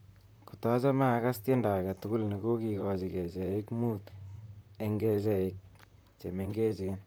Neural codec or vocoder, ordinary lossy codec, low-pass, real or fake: vocoder, 44.1 kHz, 128 mel bands, Pupu-Vocoder; none; none; fake